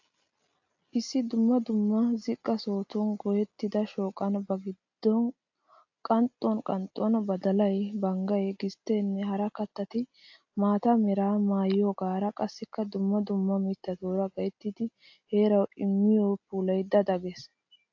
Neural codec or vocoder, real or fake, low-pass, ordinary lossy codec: none; real; 7.2 kHz; AAC, 48 kbps